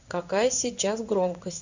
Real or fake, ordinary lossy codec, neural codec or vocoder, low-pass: fake; Opus, 64 kbps; codec, 16 kHz in and 24 kHz out, 1 kbps, XY-Tokenizer; 7.2 kHz